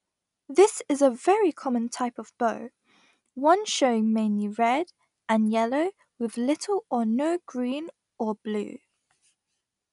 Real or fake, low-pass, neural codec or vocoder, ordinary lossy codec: real; 10.8 kHz; none; none